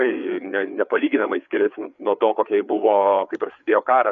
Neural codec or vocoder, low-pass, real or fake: codec, 16 kHz, 4 kbps, FreqCodec, larger model; 7.2 kHz; fake